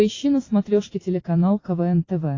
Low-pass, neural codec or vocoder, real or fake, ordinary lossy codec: 7.2 kHz; none; real; AAC, 32 kbps